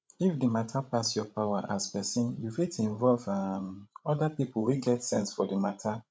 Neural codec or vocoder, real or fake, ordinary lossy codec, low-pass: codec, 16 kHz, 16 kbps, FreqCodec, larger model; fake; none; none